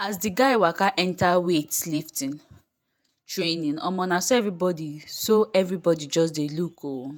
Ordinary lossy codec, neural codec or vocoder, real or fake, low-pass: none; vocoder, 48 kHz, 128 mel bands, Vocos; fake; none